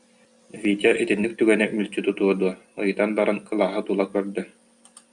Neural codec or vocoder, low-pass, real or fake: none; 10.8 kHz; real